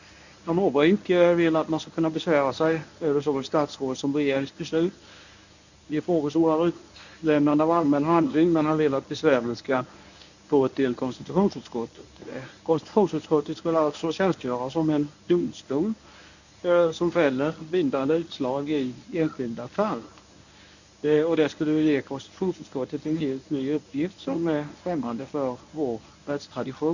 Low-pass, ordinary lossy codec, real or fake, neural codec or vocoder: 7.2 kHz; none; fake; codec, 24 kHz, 0.9 kbps, WavTokenizer, medium speech release version 1